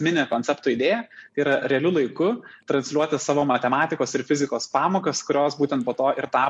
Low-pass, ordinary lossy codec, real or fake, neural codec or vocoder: 10.8 kHz; MP3, 48 kbps; real; none